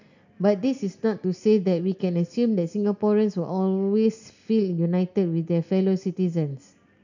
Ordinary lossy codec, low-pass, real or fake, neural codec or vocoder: none; 7.2 kHz; real; none